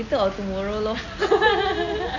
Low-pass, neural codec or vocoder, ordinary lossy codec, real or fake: 7.2 kHz; none; none; real